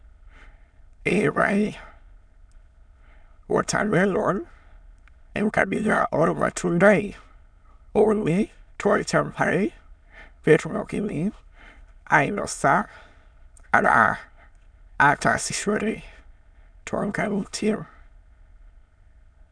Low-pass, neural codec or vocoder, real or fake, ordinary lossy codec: 9.9 kHz; autoencoder, 22.05 kHz, a latent of 192 numbers a frame, VITS, trained on many speakers; fake; none